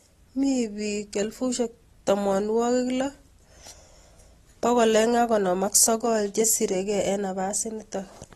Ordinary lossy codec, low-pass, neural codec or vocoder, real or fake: AAC, 32 kbps; 19.8 kHz; none; real